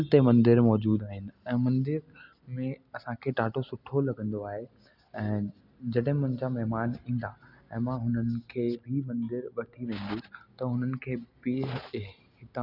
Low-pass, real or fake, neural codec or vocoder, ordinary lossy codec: 5.4 kHz; real; none; none